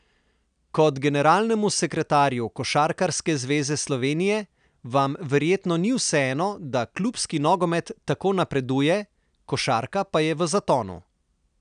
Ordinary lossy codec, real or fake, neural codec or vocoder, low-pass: none; real; none; 9.9 kHz